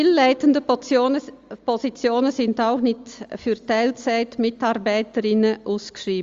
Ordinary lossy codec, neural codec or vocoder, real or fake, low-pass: Opus, 32 kbps; none; real; 7.2 kHz